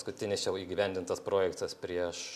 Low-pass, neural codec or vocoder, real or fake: 14.4 kHz; none; real